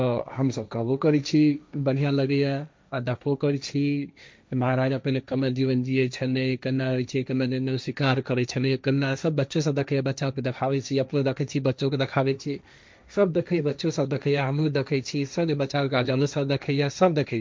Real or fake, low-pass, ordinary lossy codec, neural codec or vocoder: fake; none; none; codec, 16 kHz, 1.1 kbps, Voila-Tokenizer